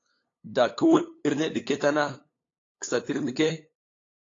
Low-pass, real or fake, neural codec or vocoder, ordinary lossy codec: 7.2 kHz; fake; codec, 16 kHz, 8 kbps, FunCodec, trained on LibriTTS, 25 frames a second; AAC, 32 kbps